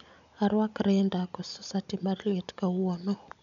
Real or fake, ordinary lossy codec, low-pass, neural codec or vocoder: real; none; 7.2 kHz; none